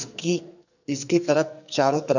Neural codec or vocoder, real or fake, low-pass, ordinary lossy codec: codec, 44.1 kHz, 2.6 kbps, SNAC; fake; 7.2 kHz; none